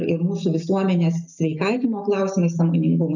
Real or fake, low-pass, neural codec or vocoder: real; 7.2 kHz; none